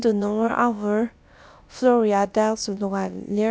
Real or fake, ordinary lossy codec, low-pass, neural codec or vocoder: fake; none; none; codec, 16 kHz, about 1 kbps, DyCAST, with the encoder's durations